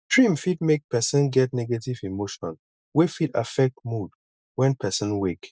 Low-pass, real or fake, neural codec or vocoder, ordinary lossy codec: none; real; none; none